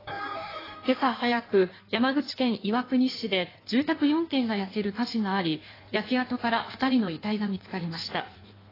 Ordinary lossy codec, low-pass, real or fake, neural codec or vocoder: AAC, 24 kbps; 5.4 kHz; fake; codec, 16 kHz in and 24 kHz out, 1.1 kbps, FireRedTTS-2 codec